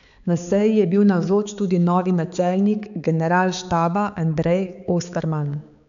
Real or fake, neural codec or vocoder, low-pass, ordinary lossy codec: fake; codec, 16 kHz, 4 kbps, X-Codec, HuBERT features, trained on balanced general audio; 7.2 kHz; none